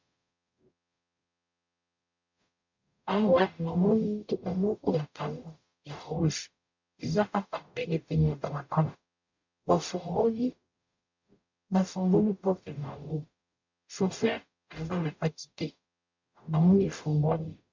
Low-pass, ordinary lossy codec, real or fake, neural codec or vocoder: 7.2 kHz; MP3, 64 kbps; fake; codec, 44.1 kHz, 0.9 kbps, DAC